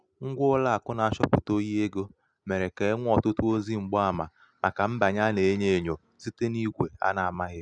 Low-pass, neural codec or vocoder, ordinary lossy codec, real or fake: 9.9 kHz; none; none; real